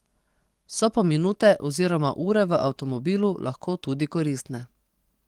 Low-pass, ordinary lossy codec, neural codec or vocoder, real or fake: 19.8 kHz; Opus, 24 kbps; codec, 44.1 kHz, 7.8 kbps, DAC; fake